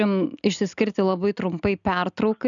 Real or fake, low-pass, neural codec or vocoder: real; 7.2 kHz; none